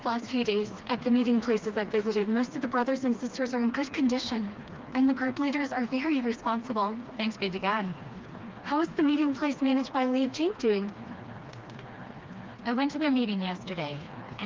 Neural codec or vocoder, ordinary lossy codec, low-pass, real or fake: codec, 16 kHz, 2 kbps, FreqCodec, smaller model; Opus, 24 kbps; 7.2 kHz; fake